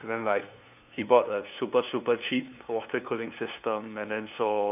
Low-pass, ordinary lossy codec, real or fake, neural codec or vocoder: 3.6 kHz; none; fake; codec, 16 kHz, 2 kbps, FunCodec, trained on LibriTTS, 25 frames a second